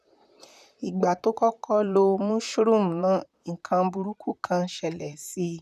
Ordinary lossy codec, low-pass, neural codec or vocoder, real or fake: none; 14.4 kHz; vocoder, 44.1 kHz, 128 mel bands, Pupu-Vocoder; fake